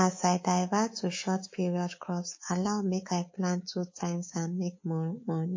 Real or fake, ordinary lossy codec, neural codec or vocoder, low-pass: fake; MP3, 32 kbps; codec, 24 kHz, 3.1 kbps, DualCodec; 7.2 kHz